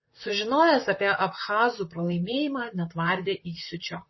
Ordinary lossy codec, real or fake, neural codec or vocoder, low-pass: MP3, 24 kbps; fake; vocoder, 44.1 kHz, 128 mel bands, Pupu-Vocoder; 7.2 kHz